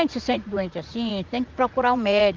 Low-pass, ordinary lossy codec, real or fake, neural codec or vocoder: 7.2 kHz; Opus, 24 kbps; fake; vocoder, 44.1 kHz, 80 mel bands, Vocos